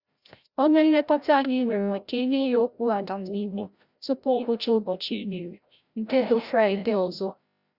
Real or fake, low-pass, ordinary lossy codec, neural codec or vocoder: fake; 5.4 kHz; Opus, 64 kbps; codec, 16 kHz, 0.5 kbps, FreqCodec, larger model